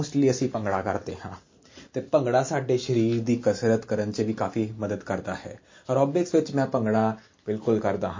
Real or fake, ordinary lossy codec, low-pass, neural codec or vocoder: real; MP3, 32 kbps; 7.2 kHz; none